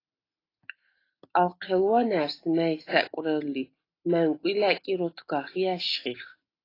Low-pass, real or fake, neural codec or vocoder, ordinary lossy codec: 5.4 kHz; real; none; AAC, 24 kbps